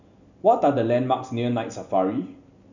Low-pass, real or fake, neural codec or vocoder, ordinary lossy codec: 7.2 kHz; real; none; none